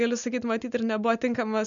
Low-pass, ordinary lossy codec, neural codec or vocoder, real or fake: 7.2 kHz; MP3, 96 kbps; none; real